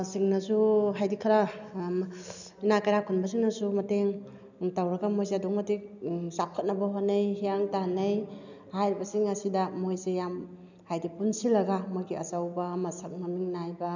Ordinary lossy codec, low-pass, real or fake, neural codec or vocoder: none; 7.2 kHz; real; none